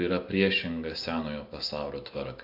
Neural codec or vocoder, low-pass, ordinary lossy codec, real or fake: none; 5.4 kHz; AAC, 32 kbps; real